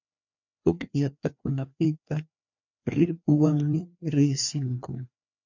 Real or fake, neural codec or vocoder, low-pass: fake; codec, 16 kHz, 2 kbps, FreqCodec, larger model; 7.2 kHz